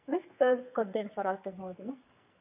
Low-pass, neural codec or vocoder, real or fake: 3.6 kHz; codec, 44.1 kHz, 2.6 kbps, SNAC; fake